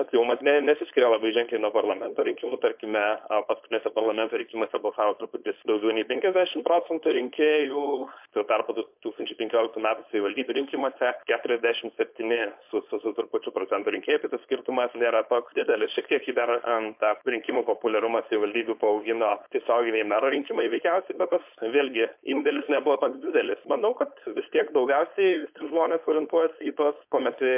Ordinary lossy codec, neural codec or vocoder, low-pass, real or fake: MP3, 32 kbps; codec, 16 kHz, 4.8 kbps, FACodec; 3.6 kHz; fake